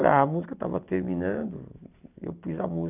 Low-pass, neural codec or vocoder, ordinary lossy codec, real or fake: 3.6 kHz; none; none; real